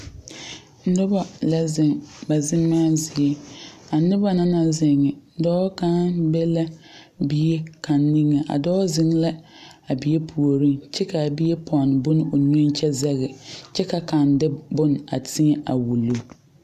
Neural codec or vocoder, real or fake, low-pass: none; real; 14.4 kHz